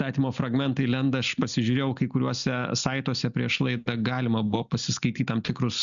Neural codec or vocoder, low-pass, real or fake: none; 7.2 kHz; real